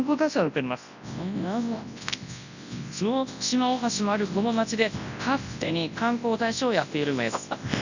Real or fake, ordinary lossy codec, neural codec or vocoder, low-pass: fake; none; codec, 24 kHz, 0.9 kbps, WavTokenizer, large speech release; 7.2 kHz